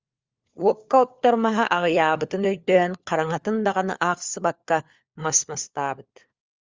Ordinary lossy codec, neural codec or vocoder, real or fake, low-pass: Opus, 32 kbps; codec, 16 kHz, 4 kbps, FunCodec, trained on LibriTTS, 50 frames a second; fake; 7.2 kHz